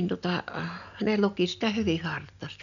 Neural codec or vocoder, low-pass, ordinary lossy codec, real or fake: none; 7.2 kHz; none; real